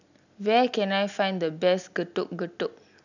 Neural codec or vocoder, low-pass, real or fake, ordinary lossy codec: none; 7.2 kHz; real; none